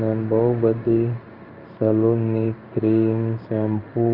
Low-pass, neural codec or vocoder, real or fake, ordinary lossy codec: 5.4 kHz; codec, 16 kHz in and 24 kHz out, 1 kbps, XY-Tokenizer; fake; Opus, 24 kbps